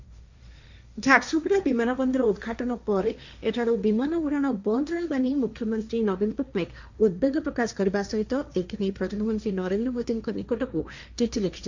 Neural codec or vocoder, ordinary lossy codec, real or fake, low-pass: codec, 16 kHz, 1.1 kbps, Voila-Tokenizer; Opus, 64 kbps; fake; 7.2 kHz